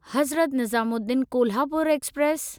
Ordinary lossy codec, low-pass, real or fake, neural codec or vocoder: none; none; real; none